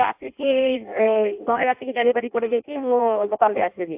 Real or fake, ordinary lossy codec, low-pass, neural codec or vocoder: fake; AAC, 32 kbps; 3.6 kHz; codec, 16 kHz in and 24 kHz out, 0.6 kbps, FireRedTTS-2 codec